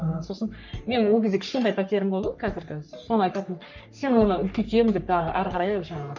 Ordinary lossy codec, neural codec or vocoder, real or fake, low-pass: none; codec, 44.1 kHz, 3.4 kbps, Pupu-Codec; fake; 7.2 kHz